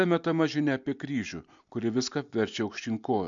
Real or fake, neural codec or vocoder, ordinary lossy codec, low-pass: real; none; MP3, 64 kbps; 7.2 kHz